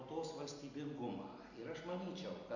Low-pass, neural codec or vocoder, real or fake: 7.2 kHz; none; real